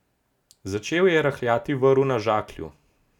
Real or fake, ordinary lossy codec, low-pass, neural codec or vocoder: real; none; 19.8 kHz; none